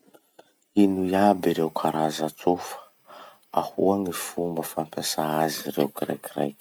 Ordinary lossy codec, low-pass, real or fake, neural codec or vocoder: none; none; real; none